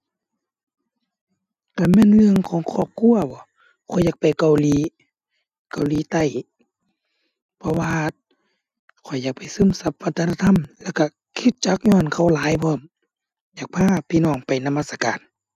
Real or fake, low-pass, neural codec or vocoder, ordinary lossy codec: real; 14.4 kHz; none; none